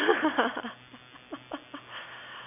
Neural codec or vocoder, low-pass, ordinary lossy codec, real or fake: none; 3.6 kHz; none; real